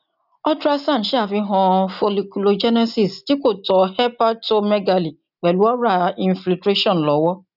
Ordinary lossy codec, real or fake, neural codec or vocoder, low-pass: none; real; none; 5.4 kHz